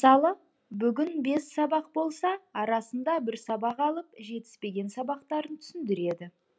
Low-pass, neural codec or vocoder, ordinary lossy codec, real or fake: none; none; none; real